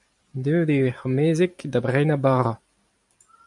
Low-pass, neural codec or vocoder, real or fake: 10.8 kHz; vocoder, 44.1 kHz, 128 mel bands every 256 samples, BigVGAN v2; fake